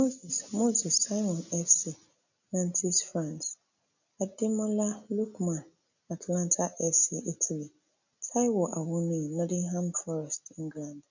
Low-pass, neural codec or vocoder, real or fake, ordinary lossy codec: 7.2 kHz; none; real; none